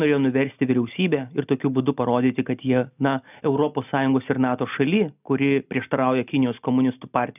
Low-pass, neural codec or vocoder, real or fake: 3.6 kHz; none; real